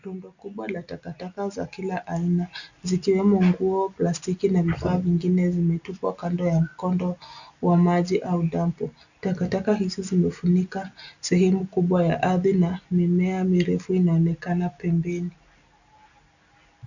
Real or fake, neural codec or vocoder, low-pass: real; none; 7.2 kHz